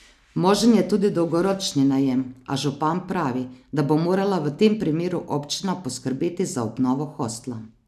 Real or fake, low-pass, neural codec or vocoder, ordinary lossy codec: real; 14.4 kHz; none; none